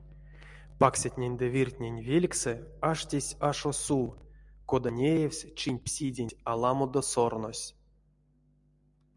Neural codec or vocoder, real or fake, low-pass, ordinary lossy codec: none; real; 10.8 kHz; MP3, 96 kbps